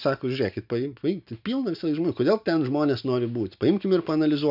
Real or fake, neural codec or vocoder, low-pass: real; none; 5.4 kHz